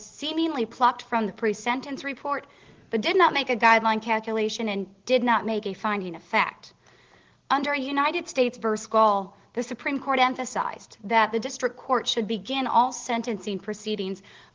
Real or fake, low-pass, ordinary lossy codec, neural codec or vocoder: real; 7.2 kHz; Opus, 16 kbps; none